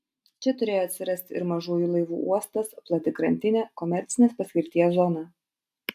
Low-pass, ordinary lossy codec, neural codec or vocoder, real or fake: 14.4 kHz; AAC, 96 kbps; none; real